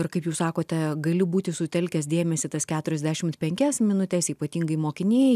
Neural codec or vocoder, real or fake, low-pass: none; real; 14.4 kHz